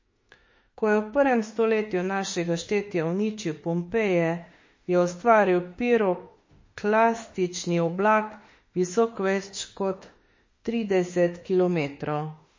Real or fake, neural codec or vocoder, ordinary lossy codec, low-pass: fake; autoencoder, 48 kHz, 32 numbers a frame, DAC-VAE, trained on Japanese speech; MP3, 32 kbps; 7.2 kHz